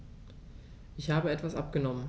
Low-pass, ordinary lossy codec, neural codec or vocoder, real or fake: none; none; none; real